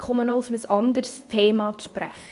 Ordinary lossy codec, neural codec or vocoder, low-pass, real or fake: AAC, 64 kbps; codec, 24 kHz, 0.9 kbps, WavTokenizer, medium speech release version 2; 10.8 kHz; fake